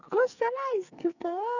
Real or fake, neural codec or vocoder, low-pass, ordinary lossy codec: fake; codec, 44.1 kHz, 2.6 kbps, SNAC; 7.2 kHz; none